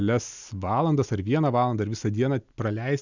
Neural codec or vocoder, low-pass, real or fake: none; 7.2 kHz; real